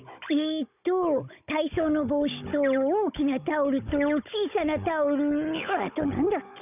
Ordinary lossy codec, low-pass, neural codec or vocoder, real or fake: none; 3.6 kHz; codec, 16 kHz, 16 kbps, FunCodec, trained on Chinese and English, 50 frames a second; fake